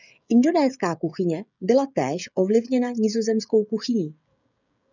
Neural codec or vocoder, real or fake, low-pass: codec, 16 kHz, 16 kbps, FreqCodec, smaller model; fake; 7.2 kHz